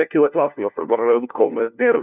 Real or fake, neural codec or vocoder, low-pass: fake; codec, 16 kHz, 1 kbps, FunCodec, trained on LibriTTS, 50 frames a second; 3.6 kHz